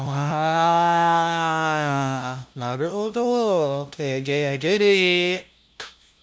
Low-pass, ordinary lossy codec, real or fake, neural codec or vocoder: none; none; fake; codec, 16 kHz, 0.5 kbps, FunCodec, trained on LibriTTS, 25 frames a second